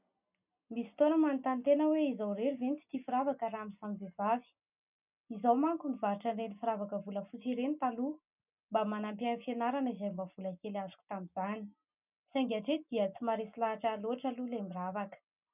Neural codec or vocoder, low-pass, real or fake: none; 3.6 kHz; real